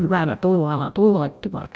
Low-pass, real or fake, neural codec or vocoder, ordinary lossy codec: none; fake; codec, 16 kHz, 0.5 kbps, FreqCodec, larger model; none